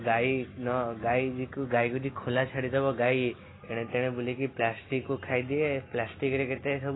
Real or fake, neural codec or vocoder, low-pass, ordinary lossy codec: real; none; 7.2 kHz; AAC, 16 kbps